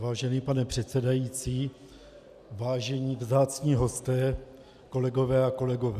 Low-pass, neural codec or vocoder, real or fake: 14.4 kHz; none; real